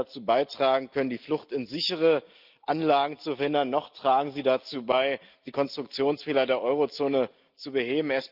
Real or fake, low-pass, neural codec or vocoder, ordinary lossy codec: real; 5.4 kHz; none; Opus, 24 kbps